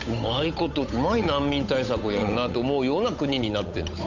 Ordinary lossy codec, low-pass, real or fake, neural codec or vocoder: none; 7.2 kHz; fake; codec, 16 kHz, 8 kbps, FunCodec, trained on Chinese and English, 25 frames a second